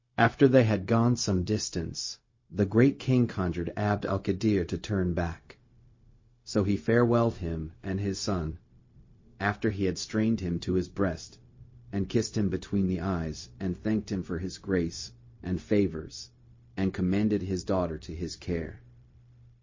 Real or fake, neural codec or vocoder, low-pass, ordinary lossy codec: fake; codec, 16 kHz, 0.4 kbps, LongCat-Audio-Codec; 7.2 kHz; MP3, 32 kbps